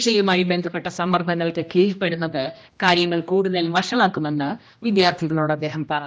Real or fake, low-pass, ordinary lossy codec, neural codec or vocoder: fake; none; none; codec, 16 kHz, 1 kbps, X-Codec, HuBERT features, trained on general audio